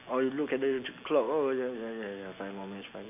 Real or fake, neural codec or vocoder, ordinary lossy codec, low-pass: real; none; none; 3.6 kHz